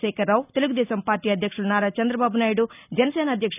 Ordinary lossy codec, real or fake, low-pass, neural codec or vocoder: none; real; 3.6 kHz; none